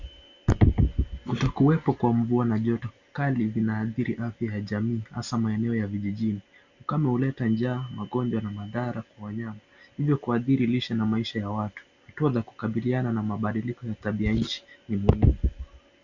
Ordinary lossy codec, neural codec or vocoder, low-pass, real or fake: Opus, 64 kbps; none; 7.2 kHz; real